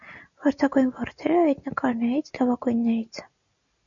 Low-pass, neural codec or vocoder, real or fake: 7.2 kHz; none; real